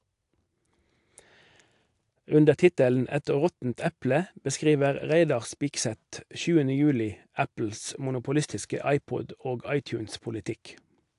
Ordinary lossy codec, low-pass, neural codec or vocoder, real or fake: AAC, 64 kbps; 10.8 kHz; none; real